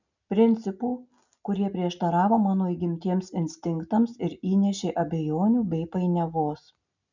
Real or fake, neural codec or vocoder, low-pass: real; none; 7.2 kHz